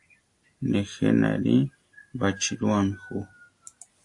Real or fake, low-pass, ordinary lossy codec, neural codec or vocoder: real; 10.8 kHz; AAC, 48 kbps; none